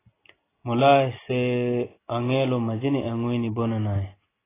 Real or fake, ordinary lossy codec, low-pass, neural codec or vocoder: real; AAC, 16 kbps; 3.6 kHz; none